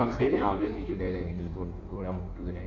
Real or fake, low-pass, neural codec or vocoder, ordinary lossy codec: fake; 7.2 kHz; codec, 16 kHz in and 24 kHz out, 1.1 kbps, FireRedTTS-2 codec; none